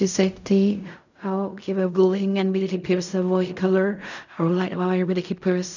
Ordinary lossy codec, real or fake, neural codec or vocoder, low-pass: none; fake; codec, 16 kHz in and 24 kHz out, 0.4 kbps, LongCat-Audio-Codec, fine tuned four codebook decoder; 7.2 kHz